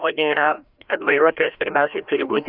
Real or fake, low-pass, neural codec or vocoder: fake; 5.4 kHz; codec, 16 kHz, 1 kbps, FreqCodec, larger model